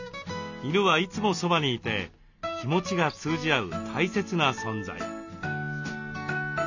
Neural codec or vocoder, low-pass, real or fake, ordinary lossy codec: none; 7.2 kHz; real; none